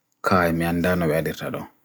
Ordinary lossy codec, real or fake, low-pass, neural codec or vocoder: none; real; none; none